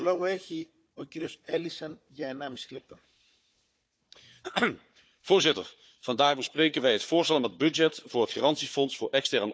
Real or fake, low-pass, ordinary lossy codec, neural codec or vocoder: fake; none; none; codec, 16 kHz, 4 kbps, FunCodec, trained on Chinese and English, 50 frames a second